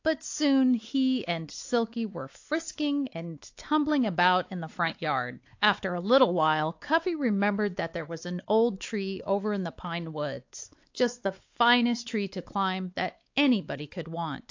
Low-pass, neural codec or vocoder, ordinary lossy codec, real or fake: 7.2 kHz; codec, 16 kHz, 4 kbps, X-Codec, WavLM features, trained on Multilingual LibriSpeech; AAC, 48 kbps; fake